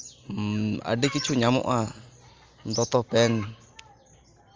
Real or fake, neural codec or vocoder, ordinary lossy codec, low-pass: real; none; Opus, 32 kbps; 7.2 kHz